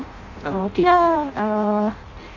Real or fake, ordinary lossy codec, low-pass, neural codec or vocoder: fake; none; 7.2 kHz; codec, 16 kHz in and 24 kHz out, 0.6 kbps, FireRedTTS-2 codec